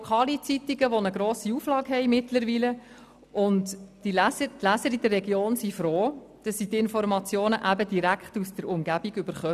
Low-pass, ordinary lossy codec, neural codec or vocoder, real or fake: 14.4 kHz; none; none; real